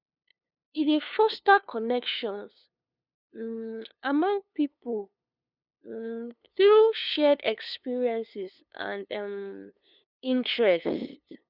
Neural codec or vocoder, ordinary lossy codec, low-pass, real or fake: codec, 16 kHz, 2 kbps, FunCodec, trained on LibriTTS, 25 frames a second; none; 5.4 kHz; fake